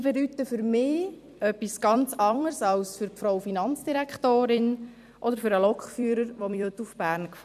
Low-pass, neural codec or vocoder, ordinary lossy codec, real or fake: 14.4 kHz; vocoder, 44.1 kHz, 128 mel bands every 256 samples, BigVGAN v2; none; fake